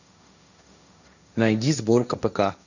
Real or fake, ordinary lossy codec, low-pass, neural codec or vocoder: fake; none; 7.2 kHz; codec, 16 kHz, 1.1 kbps, Voila-Tokenizer